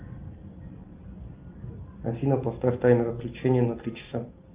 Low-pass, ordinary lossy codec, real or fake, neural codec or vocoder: 3.6 kHz; Opus, 32 kbps; real; none